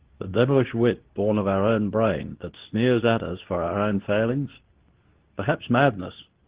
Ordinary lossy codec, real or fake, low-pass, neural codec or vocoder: Opus, 16 kbps; fake; 3.6 kHz; codec, 24 kHz, 0.9 kbps, WavTokenizer, medium speech release version 2